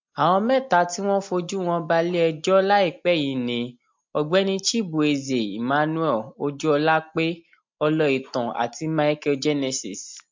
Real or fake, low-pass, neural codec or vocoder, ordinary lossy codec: real; 7.2 kHz; none; MP3, 48 kbps